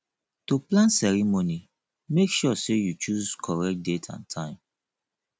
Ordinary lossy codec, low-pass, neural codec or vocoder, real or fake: none; none; none; real